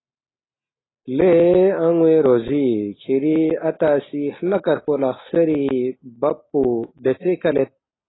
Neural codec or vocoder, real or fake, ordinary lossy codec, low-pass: none; real; AAC, 16 kbps; 7.2 kHz